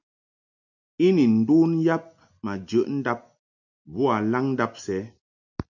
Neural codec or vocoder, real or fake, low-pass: none; real; 7.2 kHz